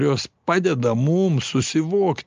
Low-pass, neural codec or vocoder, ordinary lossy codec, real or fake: 7.2 kHz; none; Opus, 24 kbps; real